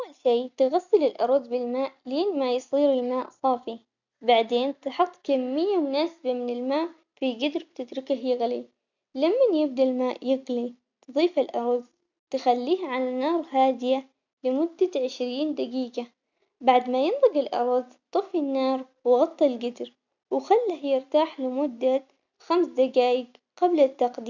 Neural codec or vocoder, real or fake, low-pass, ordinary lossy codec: none; real; 7.2 kHz; none